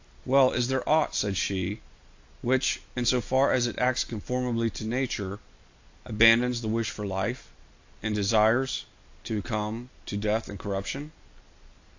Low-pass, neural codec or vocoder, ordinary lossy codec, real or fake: 7.2 kHz; none; AAC, 48 kbps; real